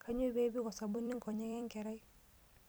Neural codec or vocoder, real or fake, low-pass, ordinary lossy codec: vocoder, 44.1 kHz, 128 mel bands every 256 samples, BigVGAN v2; fake; none; none